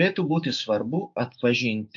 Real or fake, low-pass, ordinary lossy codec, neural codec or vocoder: fake; 7.2 kHz; AAC, 64 kbps; codec, 16 kHz, 6 kbps, DAC